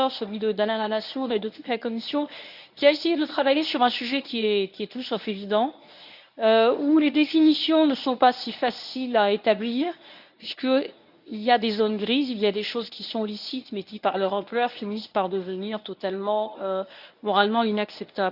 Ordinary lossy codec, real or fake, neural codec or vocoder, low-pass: none; fake; codec, 24 kHz, 0.9 kbps, WavTokenizer, medium speech release version 1; 5.4 kHz